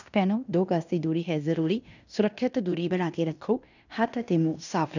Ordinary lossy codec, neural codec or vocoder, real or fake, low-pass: none; codec, 16 kHz in and 24 kHz out, 0.9 kbps, LongCat-Audio-Codec, fine tuned four codebook decoder; fake; 7.2 kHz